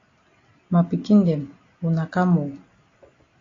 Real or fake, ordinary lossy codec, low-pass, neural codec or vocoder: real; AAC, 32 kbps; 7.2 kHz; none